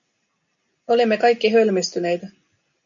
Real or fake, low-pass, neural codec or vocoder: real; 7.2 kHz; none